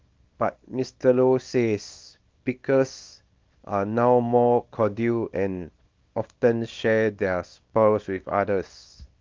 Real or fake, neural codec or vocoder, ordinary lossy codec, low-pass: fake; codec, 24 kHz, 0.9 kbps, WavTokenizer, small release; Opus, 24 kbps; 7.2 kHz